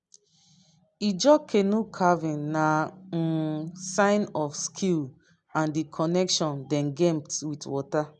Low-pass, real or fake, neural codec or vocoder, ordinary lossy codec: 10.8 kHz; real; none; none